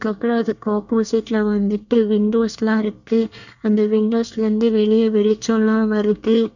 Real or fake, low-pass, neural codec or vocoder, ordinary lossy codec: fake; 7.2 kHz; codec, 24 kHz, 1 kbps, SNAC; none